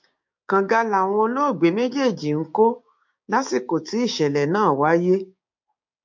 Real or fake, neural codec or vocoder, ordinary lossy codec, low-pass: fake; codec, 16 kHz, 6 kbps, DAC; MP3, 48 kbps; 7.2 kHz